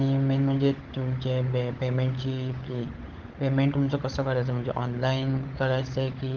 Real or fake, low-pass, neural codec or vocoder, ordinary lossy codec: fake; 7.2 kHz; codec, 16 kHz, 16 kbps, FunCodec, trained on LibriTTS, 50 frames a second; Opus, 16 kbps